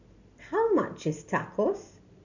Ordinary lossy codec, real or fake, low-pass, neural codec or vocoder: none; real; 7.2 kHz; none